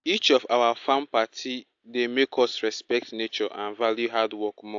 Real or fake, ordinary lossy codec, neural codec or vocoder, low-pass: real; AAC, 64 kbps; none; 7.2 kHz